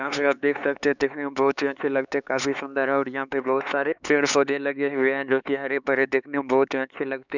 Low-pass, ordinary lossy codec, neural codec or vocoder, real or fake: 7.2 kHz; none; codec, 16 kHz, 4 kbps, X-Codec, HuBERT features, trained on LibriSpeech; fake